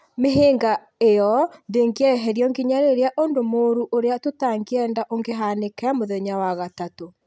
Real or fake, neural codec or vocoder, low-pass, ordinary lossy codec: real; none; none; none